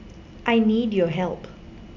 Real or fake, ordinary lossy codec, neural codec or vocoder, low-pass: real; none; none; 7.2 kHz